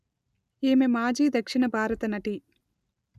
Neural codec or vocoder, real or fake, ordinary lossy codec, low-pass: none; real; none; 14.4 kHz